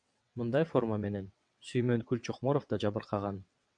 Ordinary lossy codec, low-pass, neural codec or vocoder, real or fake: MP3, 96 kbps; 9.9 kHz; vocoder, 22.05 kHz, 80 mel bands, WaveNeXt; fake